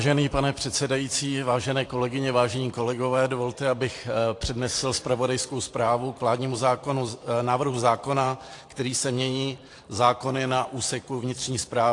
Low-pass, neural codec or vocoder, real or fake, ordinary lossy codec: 10.8 kHz; vocoder, 44.1 kHz, 128 mel bands every 512 samples, BigVGAN v2; fake; AAC, 48 kbps